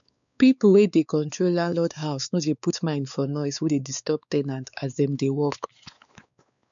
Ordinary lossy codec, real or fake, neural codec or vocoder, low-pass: none; fake; codec, 16 kHz, 4 kbps, X-Codec, WavLM features, trained on Multilingual LibriSpeech; 7.2 kHz